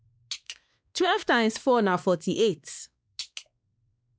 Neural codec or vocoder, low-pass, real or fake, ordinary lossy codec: codec, 16 kHz, 2 kbps, X-Codec, WavLM features, trained on Multilingual LibriSpeech; none; fake; none